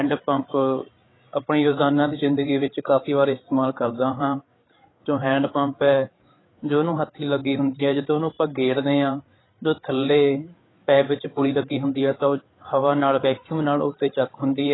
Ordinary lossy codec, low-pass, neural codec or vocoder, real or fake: AAC, 16 kbps; 7.2 kHz; codec, 16 kHz, 8 kbps, FreqCodec, larger model; fake